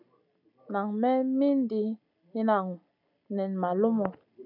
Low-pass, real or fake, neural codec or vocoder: 5.4 kHz; real; none